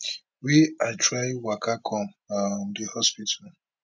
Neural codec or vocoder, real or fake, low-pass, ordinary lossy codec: none; real; none; none